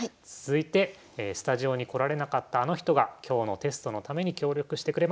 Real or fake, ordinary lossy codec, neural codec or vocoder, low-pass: real; none; none; none